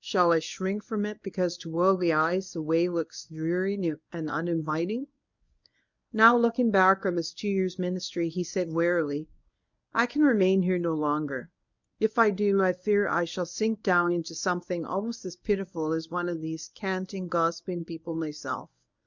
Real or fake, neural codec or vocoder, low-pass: fake; codec, 24 kHz, 0.9 kbps, WavTokenizer, medium speech release version 1; 7.2 kHz